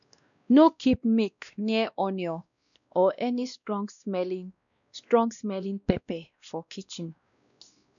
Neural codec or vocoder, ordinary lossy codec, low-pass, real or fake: codec, 16 kHz, 1 kbps, X-Codec, WavLM features, trained on Multilingual LibriSpeech; none; 7.2 kHz; fake